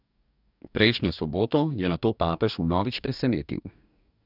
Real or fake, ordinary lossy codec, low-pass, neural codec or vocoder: fake; none; 5.4 kHz; codec, 44.1 kHz, 2.6 kbps, DAC